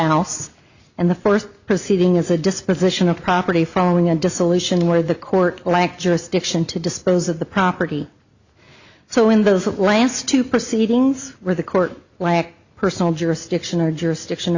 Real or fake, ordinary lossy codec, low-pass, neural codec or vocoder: real; Opus, 64 kbps; 7.2 kHz; none